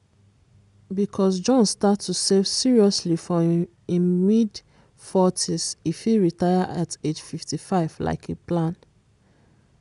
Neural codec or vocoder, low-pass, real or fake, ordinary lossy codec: none; 10.8 kHz; real; MP3, 96 kbps